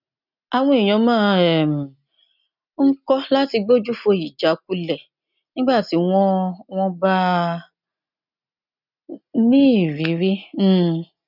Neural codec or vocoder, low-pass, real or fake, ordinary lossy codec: none; 5.4 kHz; real; none